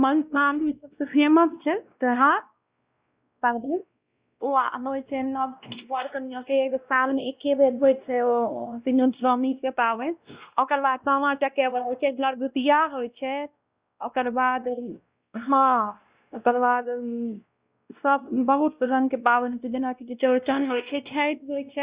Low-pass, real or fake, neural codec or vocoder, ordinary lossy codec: 3.6 kHz; fake; codec, 16 kHz, 1 kbps, X-Codec, WavLM features, trained on Multilingual LibriSpeech; Opus, 64 kbps